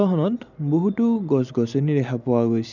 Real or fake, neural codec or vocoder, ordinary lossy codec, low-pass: real; none; none; 7.2 kHz